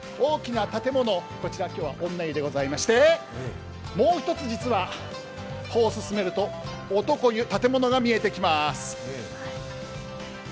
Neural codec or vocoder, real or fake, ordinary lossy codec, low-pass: none; real; none; none